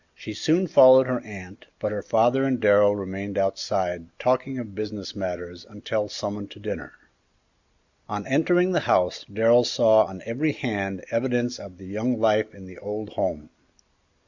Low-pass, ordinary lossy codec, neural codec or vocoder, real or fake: 7.2 kHz; Opus, 64 kbps; none; real